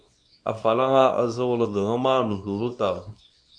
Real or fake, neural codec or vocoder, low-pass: fake; codec, 24 kHz, 0.9 kbps, WavTokenizer, small release; 9.9 kHz